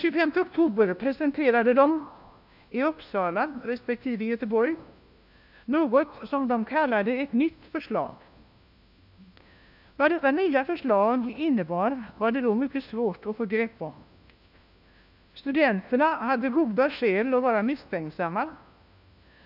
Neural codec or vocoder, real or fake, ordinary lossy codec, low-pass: codec, 16 kHz, 1 kbps, FunCodec, trained on LibriTTS, 50 frames a second; fake; none; 5.4 kHz